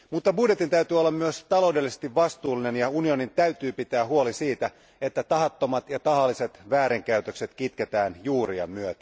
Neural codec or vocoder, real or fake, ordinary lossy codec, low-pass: none; real; none; none